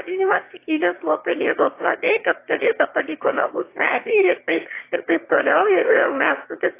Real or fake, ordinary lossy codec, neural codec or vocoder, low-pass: fake; AAC, 24 kbps; autoencoder, 22.05 kHz, a latent of 192 numbers a frame, VITS, trained on one speaker; 3.6 kHz